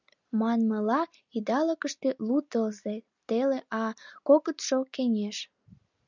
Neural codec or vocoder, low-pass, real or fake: none; 7.2 kHz; real